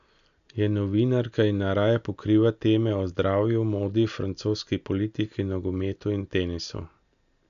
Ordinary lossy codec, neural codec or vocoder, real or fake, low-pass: none; none; real; 7.2 kHz